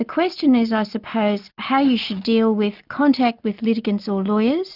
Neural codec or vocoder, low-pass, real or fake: none; 5.4 kHz; real